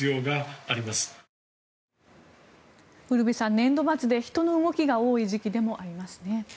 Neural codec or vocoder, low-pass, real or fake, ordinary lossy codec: none; none; real; none